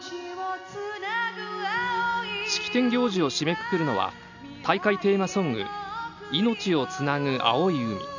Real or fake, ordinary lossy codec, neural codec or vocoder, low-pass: real; none; none; 7.2 kHz